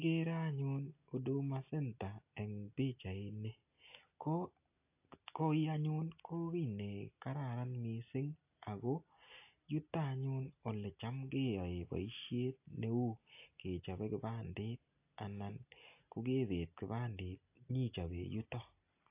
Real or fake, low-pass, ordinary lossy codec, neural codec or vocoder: real; 3.6 kHz; AAC, 32 kbps; none